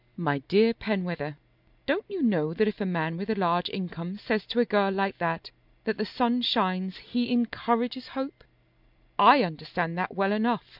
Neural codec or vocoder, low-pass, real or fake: none; 5.4 kHz; real